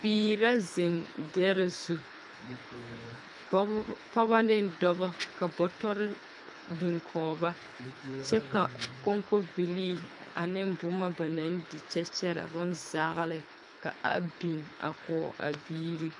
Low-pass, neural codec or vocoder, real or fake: 10.8 kHz; codec, 24 kHz, 3 kbps, HILCodec; fake